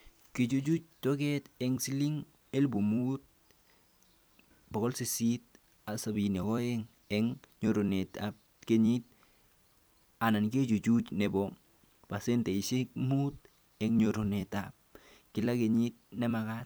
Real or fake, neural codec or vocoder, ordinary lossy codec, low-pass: fake; vocoder, 44.1 kHz, 128 mel bands every 256 samples, BigVGAN v2; none; none